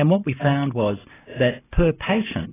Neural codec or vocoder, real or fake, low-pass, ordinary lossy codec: none; real; 3.6 kHz; AAC, 16 kbps